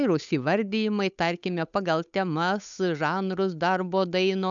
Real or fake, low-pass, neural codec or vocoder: fake; 7.2 kHz; codec, 16 kHz, 8 kbps, FunCodec, trained on Chinese and English, 25 frames a second